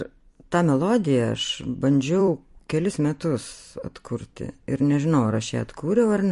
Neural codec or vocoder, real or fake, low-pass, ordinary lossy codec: vocoder, 44.1 kHz, 128 mel bands every 256 samples, BigVGAN v2; fake; 14.4 kHz; MP3, 48 kbps